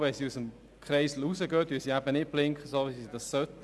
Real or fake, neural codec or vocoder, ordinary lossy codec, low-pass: real; none; none; none